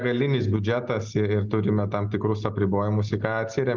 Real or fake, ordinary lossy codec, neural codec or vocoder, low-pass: real; Opus, 24 kbps; none; 7.2 kHz